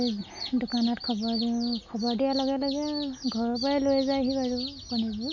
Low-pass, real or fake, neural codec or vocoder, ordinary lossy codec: 7.2 kHz; real; none; none